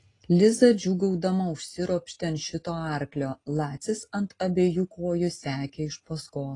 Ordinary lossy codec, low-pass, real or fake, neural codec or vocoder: AAC, 32 kbps; 10.8 kHz; real; none